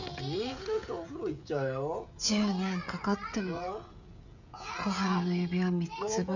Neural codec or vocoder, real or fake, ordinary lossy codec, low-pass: codec, 16 kHz, 16 kbps, FreqCodec, smaller model; fake; none; 7.2 kHz